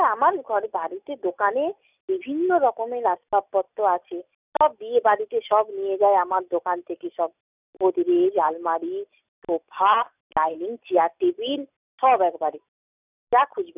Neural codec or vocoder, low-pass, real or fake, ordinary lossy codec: none; 3.6 kHz; real; none